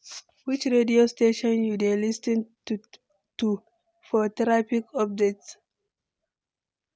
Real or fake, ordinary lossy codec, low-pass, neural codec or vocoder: real; none; none; none